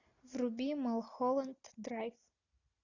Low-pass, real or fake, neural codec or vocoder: 7.2 kHz; real; none